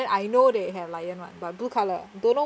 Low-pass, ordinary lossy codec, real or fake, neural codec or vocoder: none; none; real; none